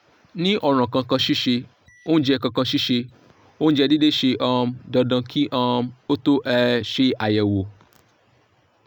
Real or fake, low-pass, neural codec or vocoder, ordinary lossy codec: real; 19.8 kHz; none; none